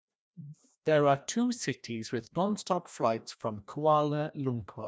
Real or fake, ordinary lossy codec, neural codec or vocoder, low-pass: fake; none; codec, 16 kHz, 1 kbps, FreqCodec, larger model; none